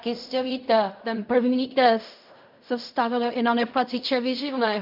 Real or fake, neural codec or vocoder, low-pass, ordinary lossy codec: fake; codec, 16 kHz in and 24 kHz out, 0.4 kbps, LongCat-Audio-Codec, fine tuned four codebook decoder; 5.4 kHz; MP3, 48 kbps